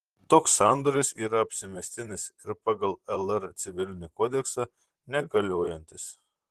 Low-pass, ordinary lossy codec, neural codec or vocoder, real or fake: 14.4 kHz; Opus, 16 kbps; vocoder, 44.1 kHz, 128 mel bands, Pupu-Vocoder; fake